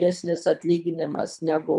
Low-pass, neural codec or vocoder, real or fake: 10.8 kHz; codec, 24 kHz, 3 kbps, HILCodec; fake